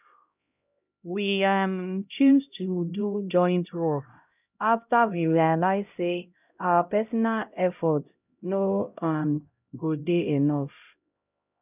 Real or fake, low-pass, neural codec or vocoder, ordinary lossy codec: fake; 3.6 kHz; codec, 16 kHz, 0.5 kbps, X-Codec, HuBERT features, trained on LibriSpeech; none